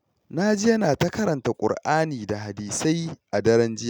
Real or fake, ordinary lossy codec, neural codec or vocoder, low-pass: real; none; none; 19.8 kHz